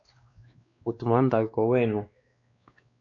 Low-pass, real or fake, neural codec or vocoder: 7.2 kHz; fake; codec, 16 kHz, 2 kbps, X-Codec, WavLM features, trained on Multilingual LibriSpeech